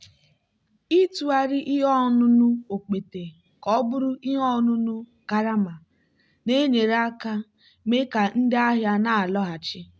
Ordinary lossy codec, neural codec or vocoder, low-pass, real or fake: none; none; none; real